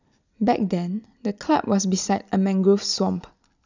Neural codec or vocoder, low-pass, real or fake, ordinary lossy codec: none; 7.2 kHz; real; none